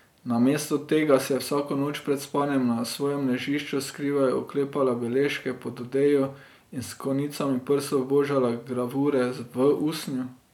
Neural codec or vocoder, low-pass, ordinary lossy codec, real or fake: none; 19.8 kHz; none; real